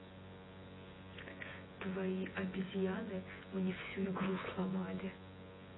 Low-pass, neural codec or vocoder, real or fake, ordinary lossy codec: 7.2 kHz; vocoder, 24 kHz, 100 mel bands, Vocos; fake; AAC, 16 kbps